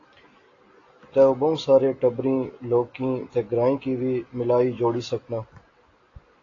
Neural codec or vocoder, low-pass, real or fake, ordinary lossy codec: none; 7.2 kHz; real; AAC, 32 kbps